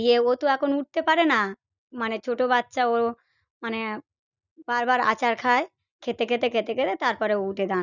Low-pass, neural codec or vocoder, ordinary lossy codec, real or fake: 7.2 kHz; none; none; real